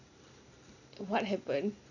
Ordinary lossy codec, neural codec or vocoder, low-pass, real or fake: none; none; 7.2 kHz; real